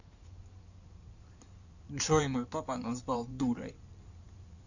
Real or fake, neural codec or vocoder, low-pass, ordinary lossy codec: fake; codec, 16 kHz in and 24 kHz out, 2.2 kbps, FireRedTTS-2 codec; 7.2 kHz; none